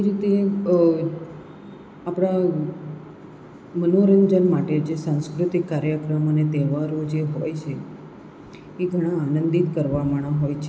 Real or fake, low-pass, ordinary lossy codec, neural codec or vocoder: real; none; none; none